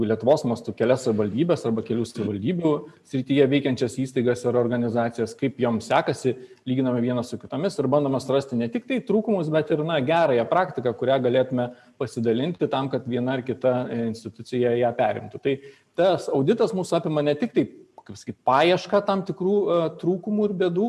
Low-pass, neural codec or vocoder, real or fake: 14.4 kHz; none; real